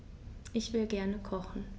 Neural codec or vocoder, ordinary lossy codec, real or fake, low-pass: none; none; real; none